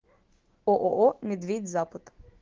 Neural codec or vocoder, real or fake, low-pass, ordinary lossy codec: autoencoder, 48 kHz, 128 numbers a frame, DAC-VAE, trained on Japanese speech; fake; 7.2 kHz; Opus, 16 kbps